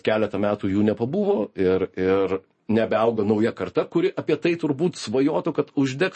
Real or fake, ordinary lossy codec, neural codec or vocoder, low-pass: real; MP3, 32 kbps; none; 10.8 kHz